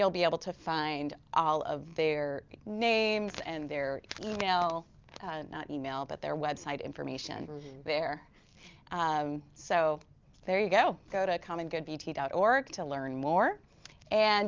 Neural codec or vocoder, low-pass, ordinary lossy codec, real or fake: none; 7.2 kHz; Opus, 24 kbps; real